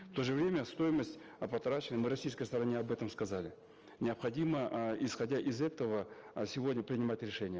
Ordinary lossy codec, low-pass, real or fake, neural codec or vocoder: Opus, 24 kbps; 7.2 kHz; real; none